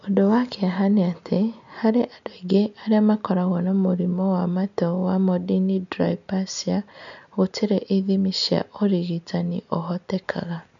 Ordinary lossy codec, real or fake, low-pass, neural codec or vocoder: none; real; 7.2 kHz; none